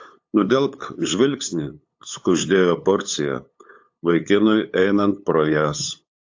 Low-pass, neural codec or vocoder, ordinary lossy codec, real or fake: 7.2 kHz; codec, 16 kHz, 8 kbps, FunCodec, trained on LibriTTS, 25 frames a second; AAC, 48 kbps; fake